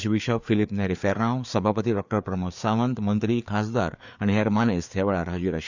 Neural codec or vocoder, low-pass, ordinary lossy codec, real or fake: codec, 16 kHz, 4 kbps, FreqCodec, larger model; 7.2 kHz; none; fake